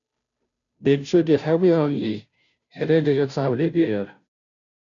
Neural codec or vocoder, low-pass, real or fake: codec, 16 kHz, 0.5 kbps, FunCodec, trained on Chinese and English, 25 frames a second; 7.2 kHz; fake